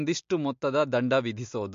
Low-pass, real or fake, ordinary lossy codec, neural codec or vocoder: 7.2 kHz; real; MP3, 48 kbps; none